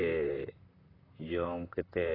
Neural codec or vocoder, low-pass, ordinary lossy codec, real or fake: vocoder, 44.1 kHz, 128 mel bands, Pupu-Vocoder; 5.4 kHz; AAC, 24 kbps; fake